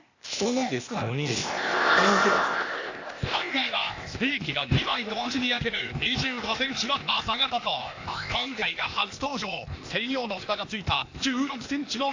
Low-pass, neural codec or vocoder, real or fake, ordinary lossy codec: 7.2 kHz; codec, 16 kHz, 0.8 kbps, ZipCodec; fake; AAC, 48 kbps